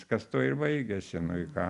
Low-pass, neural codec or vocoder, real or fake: 10.8 kHz; none; real